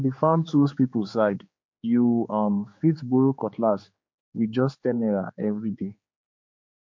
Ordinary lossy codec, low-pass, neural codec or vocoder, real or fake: MP3, 48 kbps; 7.2 kHz; codec, 16 kHz, 4 kbps, X-Codec, HuBERT features, trained on general audio; fake